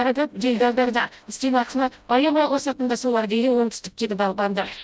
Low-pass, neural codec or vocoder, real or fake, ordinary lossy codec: none; codec, 16 kHz, 0.5 kbps, FreqCodec, smaller model; fake; none